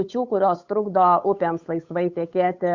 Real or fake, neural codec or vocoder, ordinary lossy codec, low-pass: fake; codec, 16 kHz, 8 kbps, FunCodec, trained on Chinese and English, 25 frames a second; Opus, 64 kbps; 7.2 kHz